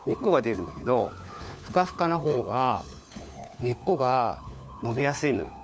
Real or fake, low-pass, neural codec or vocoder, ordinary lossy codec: fake; none; codec, 16 kHz, 4 kbps, FunCodec, trained on LibriTTS, 50 frames a second; none